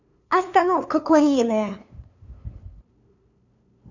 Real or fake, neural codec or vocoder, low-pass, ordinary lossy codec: fake; codec, 16 kHz, 2 kbps, FunCodec, trained on LibriTTS, 25 frames a second; 7.2 kHz; none